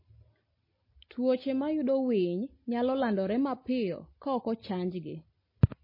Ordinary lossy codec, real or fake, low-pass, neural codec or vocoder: MP3, 24 kbps; real; 5.4 kHz; none